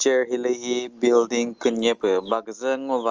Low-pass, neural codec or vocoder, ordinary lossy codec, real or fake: 7.2 kHz; none; Opus, 24 kbps; real